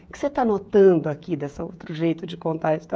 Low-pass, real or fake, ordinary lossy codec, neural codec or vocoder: none; fake; none; codec, 16 kHz, 16 kbps, FreqCodec, smaller model